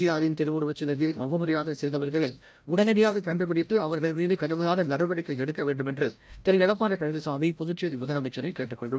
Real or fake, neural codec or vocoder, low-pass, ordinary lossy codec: fake; codec, 16 kHz, 0.5 kbps, FreqCodec, larger model; none; none